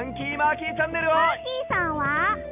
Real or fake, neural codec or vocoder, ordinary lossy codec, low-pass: real; none; none; 3.6 kHz